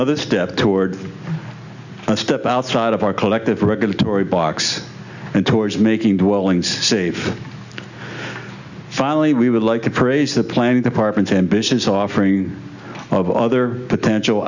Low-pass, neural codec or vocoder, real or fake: 7.2 kHz; none; real